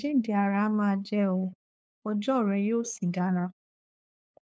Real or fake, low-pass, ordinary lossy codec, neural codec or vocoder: fake; none; none; codec, 16 kHz, 2 kbps, FunCodec, trained on LibriTTS, 25 frames a second